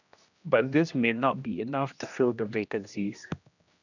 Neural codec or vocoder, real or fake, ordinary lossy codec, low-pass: codec, 16 kHz, 1 kbps, X-Codec, HuBERT features, trained on general audio; fake; none; 7.2 kHz